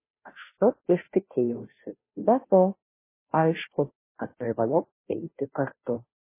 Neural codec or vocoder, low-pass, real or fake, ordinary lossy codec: codec, 16 kHz, 0.5 kbps, FunCodec, trained on Chinese and English, 25 frames a second; 3.6 kHz; fake; MP3, 16 kbps